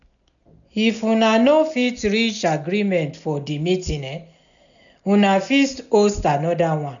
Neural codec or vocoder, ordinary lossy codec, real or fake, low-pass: none; none; real; 7.2 kHz